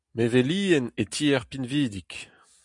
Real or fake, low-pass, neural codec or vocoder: real; 10.8 kHz; none